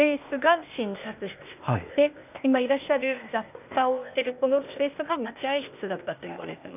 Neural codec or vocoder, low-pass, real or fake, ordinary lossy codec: codec, 16 kHz, 0.8 kbps, ZipCodec; 3.6 kHz; fake; none